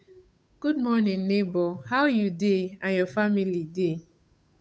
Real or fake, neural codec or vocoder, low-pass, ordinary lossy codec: fake; codec, 16 kHz, 8 kbps, FunCodec, trained on Chinese and English, 25 frames a second; none; none